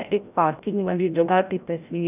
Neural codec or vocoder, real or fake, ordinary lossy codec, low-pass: codec, 16 kHz, 0.5 kbps, FreqCodec, larger model; fake; none; 3.6 kHz